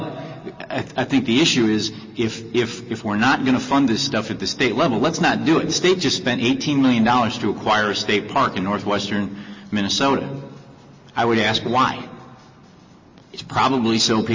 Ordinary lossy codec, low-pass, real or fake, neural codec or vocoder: MP3, 32 kbps; 7.2 kHz; real; none